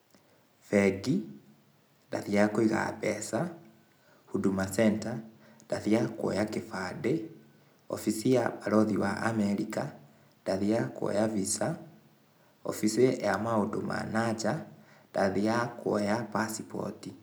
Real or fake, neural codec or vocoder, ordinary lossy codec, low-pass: real; none; none; none